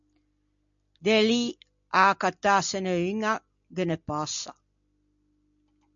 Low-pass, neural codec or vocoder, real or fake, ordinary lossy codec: 7.2 kHz; none; real; MP3, 64 kbps